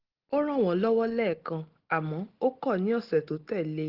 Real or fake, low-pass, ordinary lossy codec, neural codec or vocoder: real; 5.4 kHz; Opus, 16 kbps; none